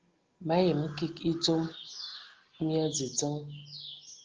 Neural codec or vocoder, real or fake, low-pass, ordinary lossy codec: none; real; 7.2 kHz; Opus, 16 kbps